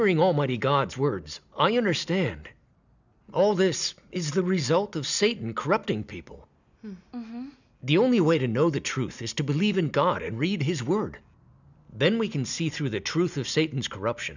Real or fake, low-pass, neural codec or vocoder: real; 7.2 kHz; none